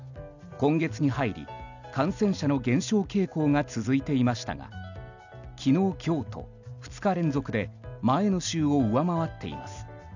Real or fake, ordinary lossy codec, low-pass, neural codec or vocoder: real; none; 7.2 kHz; none